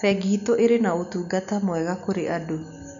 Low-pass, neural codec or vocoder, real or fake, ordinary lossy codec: 7.2 kHz; none; real; none